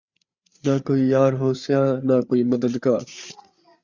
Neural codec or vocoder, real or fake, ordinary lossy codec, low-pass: codec, 16 kHz, 8 kbps, FreqCodec, larger model; fake; Opus, 64 kbps; 7.2 kHz